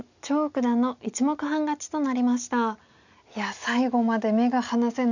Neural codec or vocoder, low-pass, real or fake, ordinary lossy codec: none; 7.2 kHz; real; none